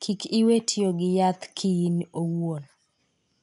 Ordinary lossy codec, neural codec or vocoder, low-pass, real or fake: none; none; 10.8 kHz; real